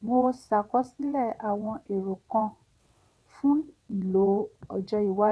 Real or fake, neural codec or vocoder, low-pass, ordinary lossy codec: fake; vocoder, 22.05 kHz, 80 mel bands, WaveNeXt; none; none